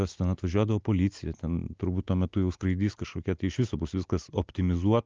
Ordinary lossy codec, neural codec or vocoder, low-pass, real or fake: Opus, 24 kbps; none; 7.2 kHz; real